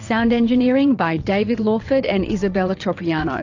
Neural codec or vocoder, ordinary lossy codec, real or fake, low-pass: vocoder, 22.05 kHz, 80 mel bands, WaveNeXt; AAC, 48 kbps; fake; 7.2 kHz